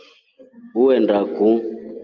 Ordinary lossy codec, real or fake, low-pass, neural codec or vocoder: Opus, 32 kbps; real; 7.2 kHz; none